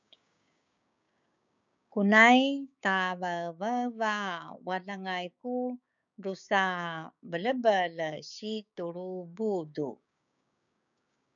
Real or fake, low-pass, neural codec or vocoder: fake; 7.2 kHz; codec, 16 kHz, 6 kbps, DAC